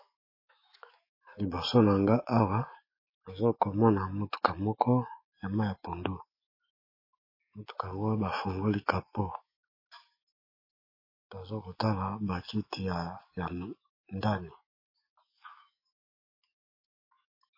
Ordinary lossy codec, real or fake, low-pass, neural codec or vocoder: MP3, 24 kbps; fake; 5.4 kHz; autoencoder, 48 kHz, 128 numbers a frame, DAC-VAE, trained on Japanese speech